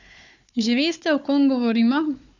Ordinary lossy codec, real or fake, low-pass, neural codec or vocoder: none; fake; 7.2 kHz; codec, 16 kHz, 4 kbps, FunCodec, trained on Chinese and English, 50 frames a second